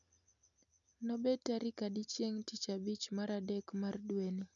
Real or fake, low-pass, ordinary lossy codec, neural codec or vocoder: real; 7.2 kHz; none; none